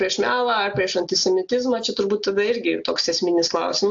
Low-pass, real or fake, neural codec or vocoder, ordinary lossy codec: 7.2 kHz; real; none; AAC, 64 kbps